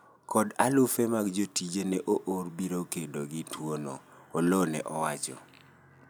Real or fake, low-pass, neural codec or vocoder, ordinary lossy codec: real; none; none; none